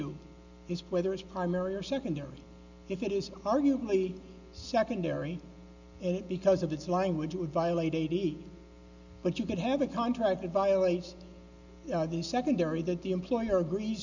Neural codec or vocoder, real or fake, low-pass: none; real; 7.2 kHz